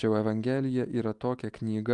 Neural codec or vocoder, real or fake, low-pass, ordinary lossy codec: none; real; 10.8 kHz; Opus, 32 kbps